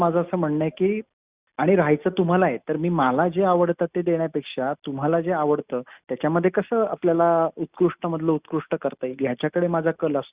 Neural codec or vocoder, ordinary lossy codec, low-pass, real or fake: none; Opus, 64 kbps; 3.6 kHz; real